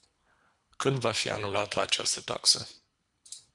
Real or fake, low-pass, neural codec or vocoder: fake; 10.8 kHz; codec, 24 kHz, 3 kbps, HILCodec